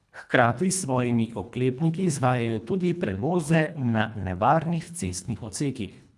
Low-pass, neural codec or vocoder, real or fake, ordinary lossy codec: none; codec, 24 kHz, 1.5 kbps, HILCodec; fake; none